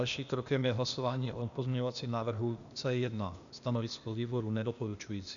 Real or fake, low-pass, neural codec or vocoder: fake; 7.2 kHz; codec, 16 kHz, 0.8 kbps, ZipCodec